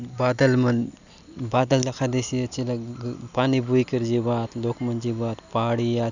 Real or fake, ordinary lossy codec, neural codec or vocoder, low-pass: real; none; none; 7.2 kHz